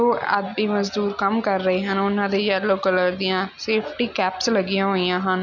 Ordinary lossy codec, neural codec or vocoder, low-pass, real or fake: none; none; 7.2 kHz; real